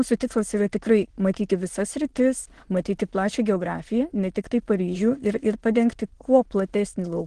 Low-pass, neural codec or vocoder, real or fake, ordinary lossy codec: 9.9 kHz; autoencoder, 22.05 kHz, a latent of 192 numbers a frame, VITS, trained on many speakers; fake; Opus, 16 kbps